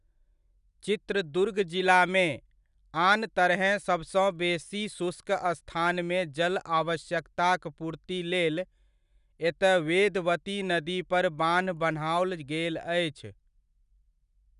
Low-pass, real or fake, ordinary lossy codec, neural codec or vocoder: 10.8 kHz; real; none; none